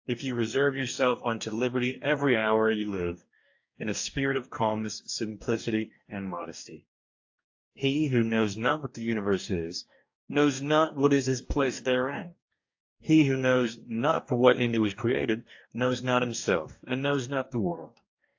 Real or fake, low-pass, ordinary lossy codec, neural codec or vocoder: fake; 7.2 kHz; AAC, 48 kbps; codec, 44.1 kHz, 2.6 kbps, DAC